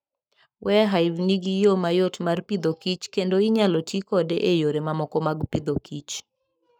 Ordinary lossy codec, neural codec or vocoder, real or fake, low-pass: none; codec, 44.1 kHz, 7.8 kbps, Pupu-Codec; fake; none